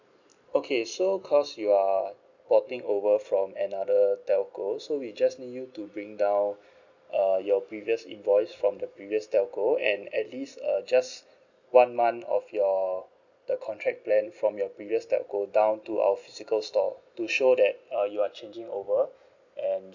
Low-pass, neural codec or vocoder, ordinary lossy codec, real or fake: 7.2 kHz; none; none; real